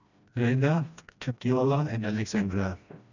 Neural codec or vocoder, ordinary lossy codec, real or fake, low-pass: codec, 16 kHz, 1 kbps, FreqCodec, smaller model; none; fake; 7.2 kHz